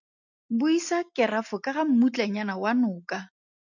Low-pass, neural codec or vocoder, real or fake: 7.2 kHz; none; real